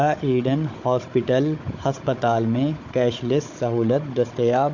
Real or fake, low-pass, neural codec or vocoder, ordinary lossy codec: fake; 7.2 kHz; codec, 16 kHz, 16 kbps, FunCodec, trained on Chinese and English, 50 frames a second; MP3, 48 kbps